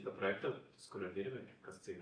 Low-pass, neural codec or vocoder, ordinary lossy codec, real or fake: 9.9 kHz; none; AAC, 32 kbps; real